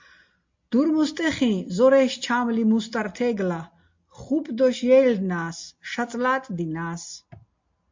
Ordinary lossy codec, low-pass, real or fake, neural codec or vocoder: MP3, 48 kbps; 7.2 kHz; real; none